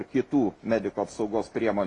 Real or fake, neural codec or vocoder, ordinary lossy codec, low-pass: real; none; AAC, 32 kbps; 10.8 kHz